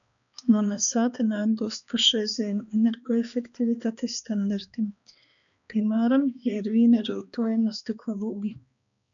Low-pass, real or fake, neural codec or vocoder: 7.2 kHz; fake; codec, 16 kHz, 2 kbps, X-Codec, HuBERT features, trained on balanced general audio